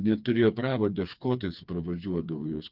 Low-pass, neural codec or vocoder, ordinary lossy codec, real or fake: 5.4 kHz; codec, 16 kHz, 4 kbps, FreqCodec, smaller model; Opus, 32 kbps; fake